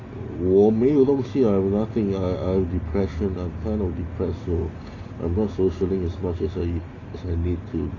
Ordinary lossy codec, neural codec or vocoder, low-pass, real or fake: AAC, 32 kbps; none; 7.2 kHz; real